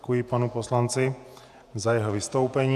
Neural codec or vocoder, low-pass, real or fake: vocoder, 48 kHz, 128 mel bands, Vocos; 14.4 kHz; fake